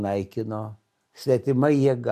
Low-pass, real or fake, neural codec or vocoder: 14.4 kHz; real; none